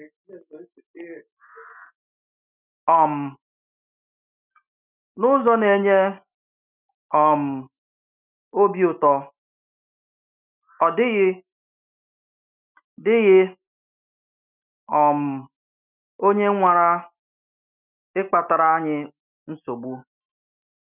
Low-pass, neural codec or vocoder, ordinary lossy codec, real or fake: 3.6 kHz; none; none; real